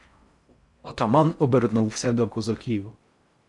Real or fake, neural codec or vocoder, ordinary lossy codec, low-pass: fake; codec, 16 kHz in and 24 kHz out, 0.6 kbps, FocalCodec, streaming, 4096 codes; MP3, 96 kbps; 10.8 kHz